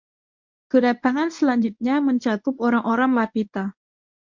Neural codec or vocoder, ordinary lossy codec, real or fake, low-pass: codec, 24 kHz, 0.9 kbps, WavTokenizer, medium speech release version 1; MP3, 48 kbps; fake; 7.2 kHz